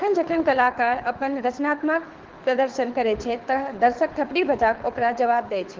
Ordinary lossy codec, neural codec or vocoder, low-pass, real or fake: Opus, 16 kbps; codec, 24 kHz, 6 kbps, HILCodec; 7.2 kHz; fake